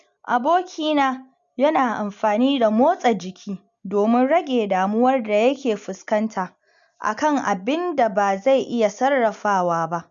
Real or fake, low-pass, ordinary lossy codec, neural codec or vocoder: real; 7.2 kHz; none; none